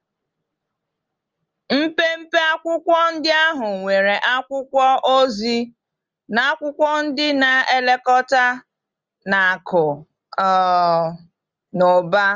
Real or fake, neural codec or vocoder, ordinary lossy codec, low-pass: real; none; Opus, 32 kbps; 7.2 kHz